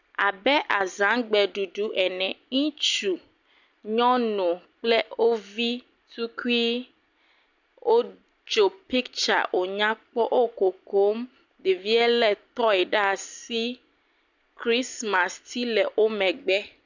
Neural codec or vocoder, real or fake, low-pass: none; real; 7.2 kHz